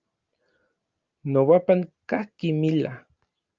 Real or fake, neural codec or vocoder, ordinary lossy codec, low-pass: real; none; Opus, 24 kbps; 7.2 kHz